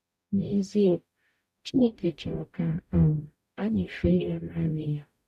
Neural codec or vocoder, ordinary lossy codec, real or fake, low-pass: codec, 44.1 kHz, 0.9 kbps, DAC; MP3, 96 kbps; fake; 14.4 kHz